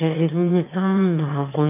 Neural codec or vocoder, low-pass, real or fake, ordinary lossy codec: autoencoder, 22.05 kHz, a latent of 192 numbers a frame, VITS, trained on one speaker; 3.6 kHz; fake; none